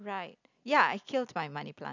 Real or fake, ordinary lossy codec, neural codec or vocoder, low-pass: real; none; none; 7.2 kHz